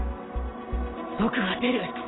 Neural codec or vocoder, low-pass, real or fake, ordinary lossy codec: none; 7.2 kHz; real; AAC, 16 kbps